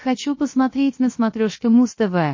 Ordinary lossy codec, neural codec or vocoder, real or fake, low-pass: MP3, 32 kbps; codec, 16 kHz, 0.7 kbps, FocalCodec; fake; 7.2 kHz